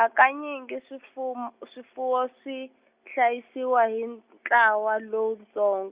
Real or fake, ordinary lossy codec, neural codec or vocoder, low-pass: real; Opus, 64 kbps; none; 3.6 kHz